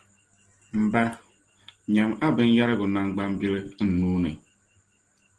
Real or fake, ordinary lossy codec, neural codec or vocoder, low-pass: real; Opus, 16 kbps; none; 10.8 kHz